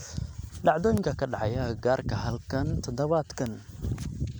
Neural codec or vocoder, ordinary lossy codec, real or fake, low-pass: none; none; real; none